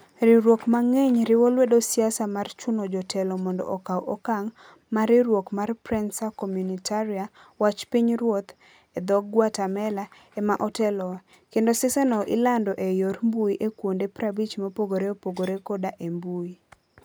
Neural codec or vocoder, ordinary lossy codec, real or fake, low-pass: none; none; real; none